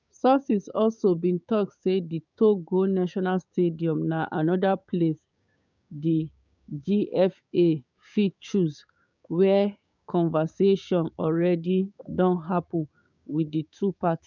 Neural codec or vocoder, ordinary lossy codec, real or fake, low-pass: codec, 16 kHz, 6 kbps, DAC; none; fake; 7.2 kHz